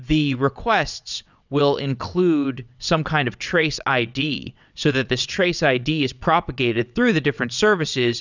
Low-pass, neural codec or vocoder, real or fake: 7.2 kHz; vocoder, 22.05 kHz, 80 mel bands, WaveNeXt; fake